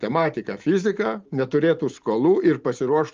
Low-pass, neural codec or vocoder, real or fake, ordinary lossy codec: 7.2 kHz; none; real; Opus, 24 kbps